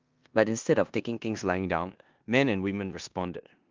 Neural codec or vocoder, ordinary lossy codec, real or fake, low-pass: codec, 16 kHz in and 24 kHz out, 0.9 kbps, LongCat-Audio-Codec, four codebook decoder; Opus, 32 kbps; fake; 7.2 kHz